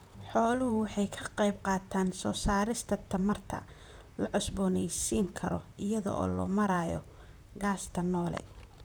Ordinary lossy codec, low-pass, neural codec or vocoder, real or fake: none; none; vocoder, 44.1 kHz, 128 mel bands, Pupu-Vocoder; fake